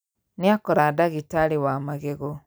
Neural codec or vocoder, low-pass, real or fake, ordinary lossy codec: none; none; real; none